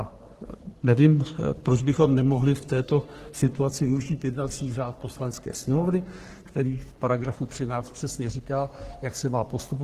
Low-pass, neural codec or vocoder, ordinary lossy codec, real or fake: 14.4 kHz; codec, 44.1 kHz, 3.4 kbps, Pupu-Codec; Opus, 16 kbps; fake